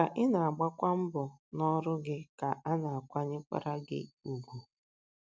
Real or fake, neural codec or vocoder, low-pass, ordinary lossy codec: real; none; none; none